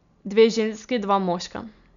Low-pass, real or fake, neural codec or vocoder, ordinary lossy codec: 7.2 kHz; real; none; none